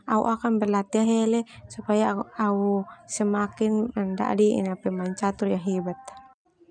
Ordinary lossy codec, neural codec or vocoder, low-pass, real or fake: none; none; 9.9 kHz; real